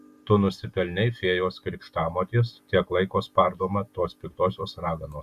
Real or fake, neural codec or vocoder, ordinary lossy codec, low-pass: real; none; Opus, 64 kbps; 14.4 kHz